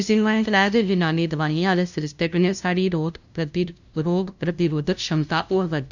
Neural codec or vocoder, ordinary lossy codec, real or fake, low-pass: codec, 16 kHz, 0.5 kbps, FunCodec, trained on LibriTTS, 25 frames a second; none; fake; 7.2 kHz